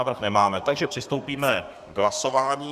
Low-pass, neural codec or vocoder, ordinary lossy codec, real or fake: 14.4 kHz; codec, 32 kHz, 1.9 kbps, SNAC; Opus, 64 kbps; fake